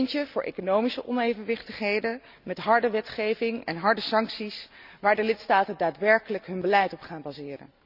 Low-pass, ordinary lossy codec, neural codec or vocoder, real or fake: 5.4 kHz; none; none; real